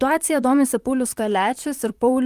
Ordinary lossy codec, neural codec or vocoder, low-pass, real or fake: Opus, 32 kbps; vocoder, 44.1 kHz, 128 mel bands, Pupu-Vocoder; 14.4 kHz; fake